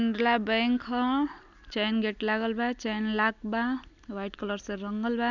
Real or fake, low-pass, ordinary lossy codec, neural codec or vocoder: real; 7.2 kHz; none; none